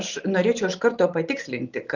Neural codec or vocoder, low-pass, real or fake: none; 7.2 kHz; real